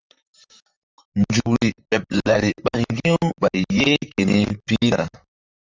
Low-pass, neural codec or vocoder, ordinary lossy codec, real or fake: 7.2 kHz; vocoder, 44.1 kHz, 128 mel bands, Pupu-Vocoder; Opus, 32 kbps; fake